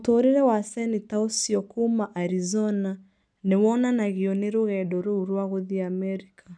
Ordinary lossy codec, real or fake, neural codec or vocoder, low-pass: none; real; none; 9.9 kHz